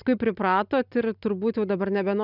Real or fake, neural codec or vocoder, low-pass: real; none; 5.4 kHz